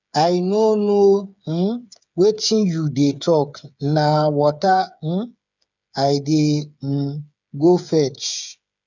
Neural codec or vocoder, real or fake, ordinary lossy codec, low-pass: codec, 16 kHz, 8 kbps, FreqCodec, smaller model; fake; none; 7.2 kHz